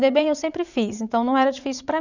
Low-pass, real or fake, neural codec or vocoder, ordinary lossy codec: 7.2 kHz; fake; vocoder, 44.1 kHz, 80 mel bands, Vocos; none